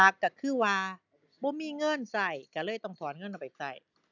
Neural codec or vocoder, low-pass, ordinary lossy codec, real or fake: none; 7.2 kHz; none; real